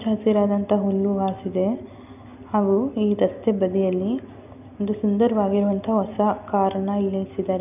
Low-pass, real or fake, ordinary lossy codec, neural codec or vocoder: 3.6 kHz; real; none; none